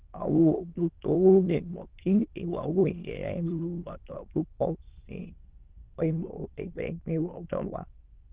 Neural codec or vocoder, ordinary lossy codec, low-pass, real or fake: autoencoder, 22.05 kHz, a latent of 192 numbers a frame, VITS, trained on many speakers; Opus, 16 kbps; 3.6 kHz; fake